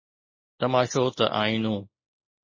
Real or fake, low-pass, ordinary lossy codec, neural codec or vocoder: real; 7.2 kHz; MP3, 32 kbps; none